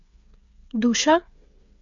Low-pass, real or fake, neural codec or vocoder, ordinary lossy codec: 7.2 kHz; fake; codec, 16 kHz, 8 kbps, FreqCodec, smaller model; none